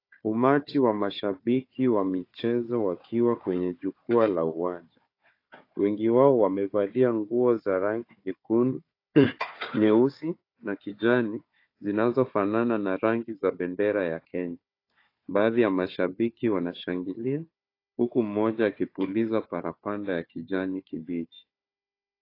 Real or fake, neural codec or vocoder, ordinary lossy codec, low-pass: fake; codec, 16 kHz, 4 kbps, FunCodec, trained on Chinese and English, 50 frames a second; AAC, 32 kbps; 5.4 kHz